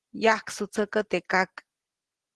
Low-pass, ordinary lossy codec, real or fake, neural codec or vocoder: 10.8 kHz; Opus, 16 kbps; real; none